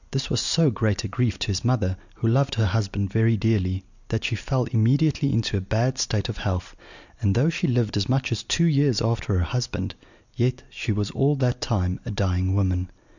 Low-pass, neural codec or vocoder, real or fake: 7.2 kHz; none; real